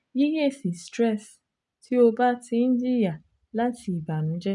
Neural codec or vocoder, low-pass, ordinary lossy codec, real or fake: none; 10.8 kHz; none; real